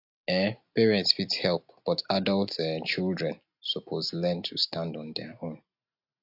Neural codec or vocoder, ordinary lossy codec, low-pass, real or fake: none; AAC, 48 kbps; 5.4 kHz; real